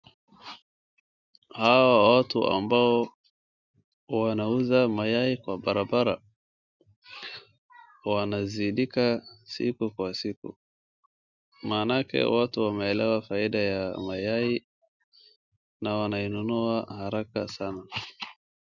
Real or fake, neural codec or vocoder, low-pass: real; none; 7.2 kHz